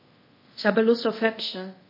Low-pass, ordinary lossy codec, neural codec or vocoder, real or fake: 5.4 kHz; MP3, 24 kbps; codec, 24 kHz, 0.5 kbps, DualCodec; fake